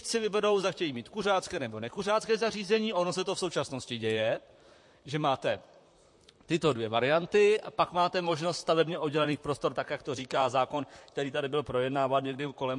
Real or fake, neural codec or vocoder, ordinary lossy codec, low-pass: fake; vocoder, 44.1 kHz, 128 mel bands, Pupu-Vocoder; MP3, 48 kbps; 10.8 kHz